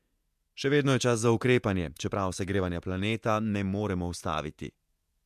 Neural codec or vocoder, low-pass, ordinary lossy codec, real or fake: vocoder, 48 kHz, 128 mel bands, Vocos; 14.4 kHz; MP3, 96 kbps; fake